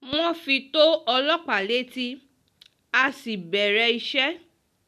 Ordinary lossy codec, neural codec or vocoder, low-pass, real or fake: none; none; 14.4 kHz; real